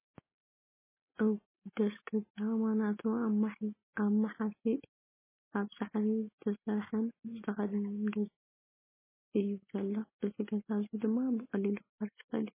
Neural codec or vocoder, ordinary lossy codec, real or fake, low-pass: none; MP3, 16 kbps; real; 3.6 kHz